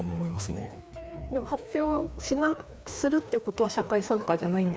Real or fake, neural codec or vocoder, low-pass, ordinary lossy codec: fake; codec, 16 kHz, 2 kbps, FreqCodec, larger model; none; none